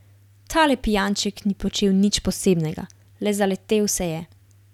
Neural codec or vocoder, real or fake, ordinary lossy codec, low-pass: none; real; none; 19.8 kHz